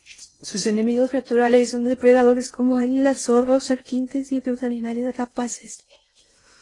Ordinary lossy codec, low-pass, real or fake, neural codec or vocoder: AAC, 32 kbps; 10.8 kHz; fake; codec, 16 kHz in and 24 kHz out, 0.6 kbps, FocalCodec, streaming, 4096 codes